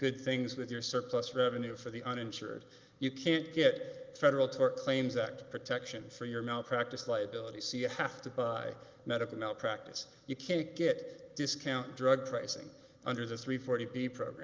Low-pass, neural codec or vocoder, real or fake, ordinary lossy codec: 7.2 kHz; none; real; Opus, 32 kbps